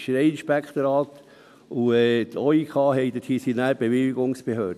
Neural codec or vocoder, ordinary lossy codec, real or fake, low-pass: none; none; real; 14.4 kHz